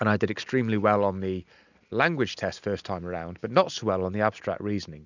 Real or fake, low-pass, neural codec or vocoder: real; 7.2 kHz; none